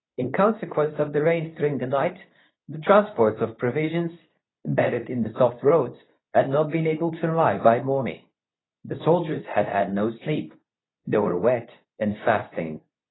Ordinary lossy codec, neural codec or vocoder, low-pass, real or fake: AAC, 16 kbps; codec, 24 kHz, 0.9 kbps, WavTokenizer, medium speech release version 2; 7.2 kHz; fake